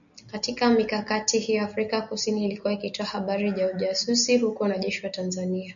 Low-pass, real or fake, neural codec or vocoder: 7.2 kHz; real; none